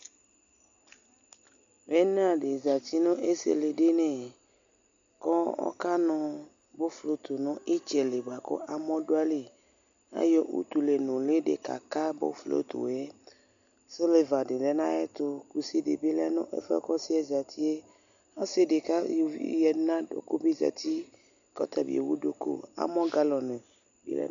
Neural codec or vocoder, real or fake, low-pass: none; real; 7.2 kHz